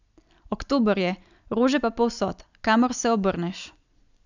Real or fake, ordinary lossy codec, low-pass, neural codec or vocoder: real; none; 7.2 kHz; none